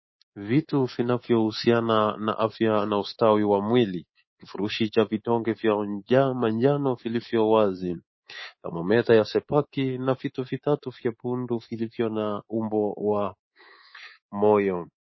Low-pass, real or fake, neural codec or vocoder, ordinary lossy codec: 7.2 kHz; fake; codec, 24 kHz, 3.1 kbps, DualCodec; MP3, 24 kbps